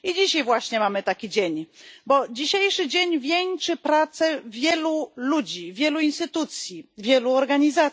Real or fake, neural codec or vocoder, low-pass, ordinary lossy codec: real; none; none; none